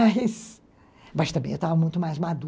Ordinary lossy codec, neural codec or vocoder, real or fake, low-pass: none; none; real; none